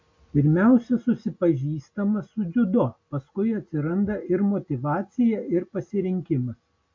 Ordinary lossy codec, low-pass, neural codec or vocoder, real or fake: MP3, 48 kbps; 7.2 kHz; none; real